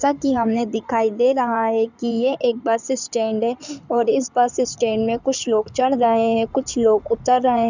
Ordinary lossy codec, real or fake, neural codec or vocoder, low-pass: none; fake; codec, 16 kHz in and 24 kHz out, 2.2 kbps, FireRedTTS-2 codec; 7.2 kHz